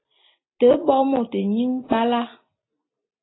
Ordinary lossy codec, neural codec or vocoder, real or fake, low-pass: AAC, 16 kbps; none; real; 7.2 kHz